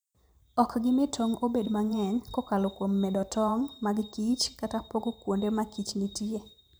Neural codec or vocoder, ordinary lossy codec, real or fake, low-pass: vocoder, 44.1 kHz, 128 mel bands every 512 samples, BigVGAN v2; none; fake; none